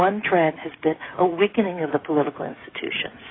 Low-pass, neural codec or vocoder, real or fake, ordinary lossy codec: 7.2 kHz; codec, 16 kHz, 16 kbps, FreqCodec, smaller model; fake; AAC, 16 kbps